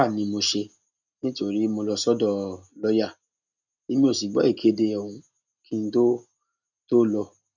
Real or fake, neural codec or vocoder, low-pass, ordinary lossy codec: real; none; 7.2 kHz; none